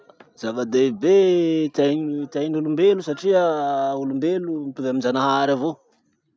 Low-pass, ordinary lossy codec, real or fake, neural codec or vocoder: none; none; real; none